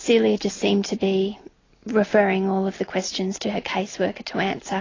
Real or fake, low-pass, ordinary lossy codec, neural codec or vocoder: real; 7.2 kHz; AAC, 32 kbps; none